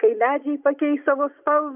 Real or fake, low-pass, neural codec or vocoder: fake; 3.6 kHz; vocoder, 24 kHz, 100 mel bands, Vocos